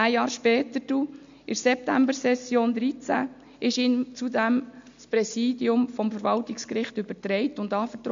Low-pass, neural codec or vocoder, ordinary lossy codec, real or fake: 7.2 kHz; none; MP3, 64 kbps; real